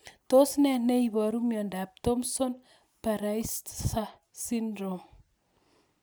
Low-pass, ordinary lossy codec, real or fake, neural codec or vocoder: none; none; real; none